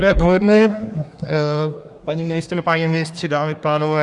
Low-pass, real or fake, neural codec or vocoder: 10.8 kHz; fake; codec, 24 kHz, 1 kbps, SNAC